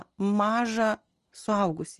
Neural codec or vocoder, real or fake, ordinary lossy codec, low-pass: none; real; Opus, 24 kbps; 10.8 kHz